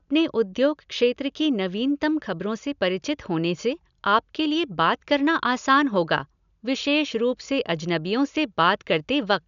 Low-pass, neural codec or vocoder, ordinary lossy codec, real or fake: 7.2 kHz; none; none; real